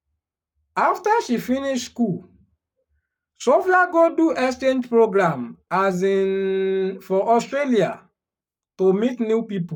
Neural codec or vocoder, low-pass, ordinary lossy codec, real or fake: codec, 44.1 kHz, 7.8 kbps, Pupu-Codec; 19.8 kHz; none; fake